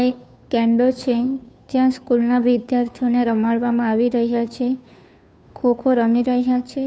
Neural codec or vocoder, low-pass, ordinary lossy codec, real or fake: codec, 16 kHz, 2 kbps, FunCodec, trained on Chinese and English, 25 frames a second; none; none; fake